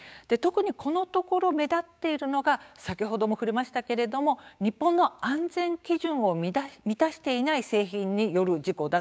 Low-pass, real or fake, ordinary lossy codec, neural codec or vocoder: none; fake; none; codec, 16 kHz, 6 kbps, DAC